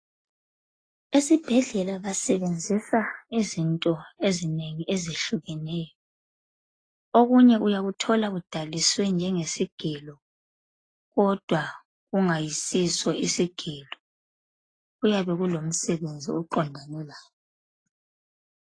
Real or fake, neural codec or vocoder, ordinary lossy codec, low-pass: real; none; AAC, 32 kbps; 9.9 kHz